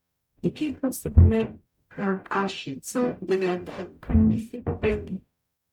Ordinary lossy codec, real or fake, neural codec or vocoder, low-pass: none; fake; codec, 44.1 kHz, 0.9 kbps, DAC; 19.8 kHz